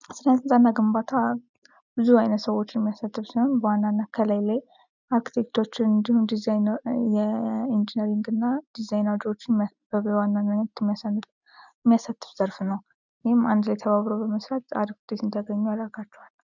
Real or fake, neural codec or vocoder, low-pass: real; none; 7.2 kHz